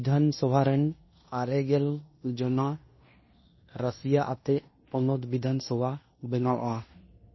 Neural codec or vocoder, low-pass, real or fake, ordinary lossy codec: codec, 16 kHz in and 24 kHz out, 0.9 kbps, LongCat-Audio-Codec, fine tuned four codebook decoder; 7.2 kHz; fake; MP3, 24 kbps